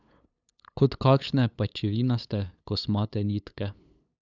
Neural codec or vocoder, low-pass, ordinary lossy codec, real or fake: codec, 16 kHz, 8 kbps, FunCodec, trained on LibriTTS, 25 frames a second; 7.2 kHz; none; fake